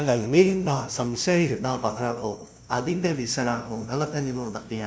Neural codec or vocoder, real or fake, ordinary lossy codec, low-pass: codec, 16 kHz, 0.5 kbps, FunCodec, trained on LibriTTS, 25 frames a second; fake; none; none